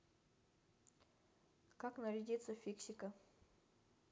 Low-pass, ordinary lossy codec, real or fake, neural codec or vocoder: none; none; real; none